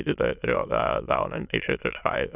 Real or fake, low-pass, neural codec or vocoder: fake; 3.6 kHz; autoencoder, 22.05 kHz, a latent of 192 numbers a frame, VITS, trained on many speakers